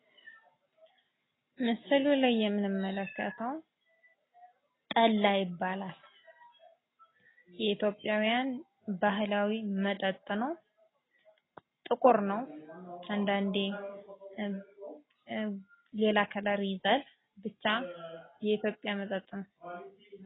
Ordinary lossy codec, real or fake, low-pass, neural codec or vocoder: AAC, 16 kbps; real; 7.2 kHz; none